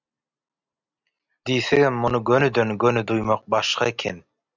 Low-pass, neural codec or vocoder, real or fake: 7.2 kHz; none; real